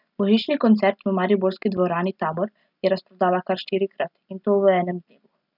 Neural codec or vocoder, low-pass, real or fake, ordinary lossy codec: none; 5.4 kHz; real; none